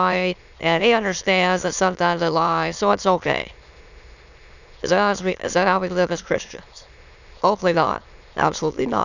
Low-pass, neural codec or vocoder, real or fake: 7.2 kHz; autoencoder, 22.05 kHz, a latent of 192 numbers a frame, VITS, trained on many speakers; fake